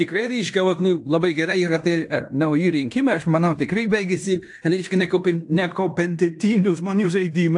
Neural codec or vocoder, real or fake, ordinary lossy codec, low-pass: codec, 16 kHz in and 24 kHz out, 0.9 kbps, LongCat-Audio-Codec, fine tuned four codebook decoder; fake; AAC, 64 kbps; 10.8 kHz